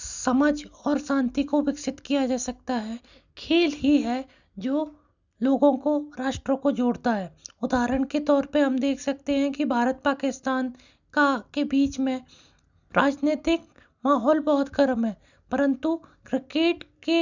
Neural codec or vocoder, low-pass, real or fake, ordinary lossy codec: none; 7.2 kHz; real; none